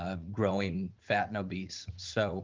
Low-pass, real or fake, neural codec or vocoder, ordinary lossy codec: 7.2 kHz; fake; vocoder, 44.1 kHz, 80 mel bands, Vocos; Opus, 24 kbps